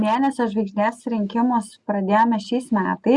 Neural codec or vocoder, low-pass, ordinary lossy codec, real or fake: none; 10.8 kHz; Opus, 64 kbps; real